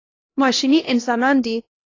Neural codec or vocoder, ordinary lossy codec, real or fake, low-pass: codec, 16 kHz, 1 kbps, X-Codec, HuBERT features, trained on LibriSpeech; AAC, 32 kbps; fake; 7.2 kHz